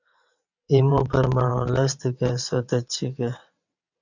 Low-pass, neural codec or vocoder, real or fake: 7.2 kHz; vocoder, 22.05 kHz, 80 mel bands, WaveNeXt; fake